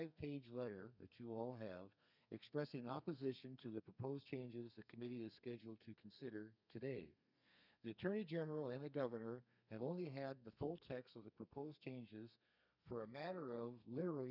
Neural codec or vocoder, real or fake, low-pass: codec, 32 kHz, 1.9 kbps, SNAC; fake; 5.4 kHz